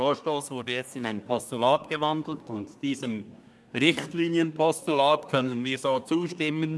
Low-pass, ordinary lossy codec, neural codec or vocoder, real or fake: none; none; codec, 24 kHz, 1 kbps, SNAC; fake